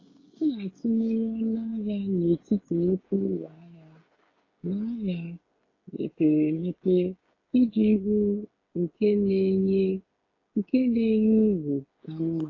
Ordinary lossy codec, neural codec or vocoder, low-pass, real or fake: AAC, 32 kbps; codec, 16 kHz, 16 kbps, FreqCodec, smaller model; 7.2 kHz; fake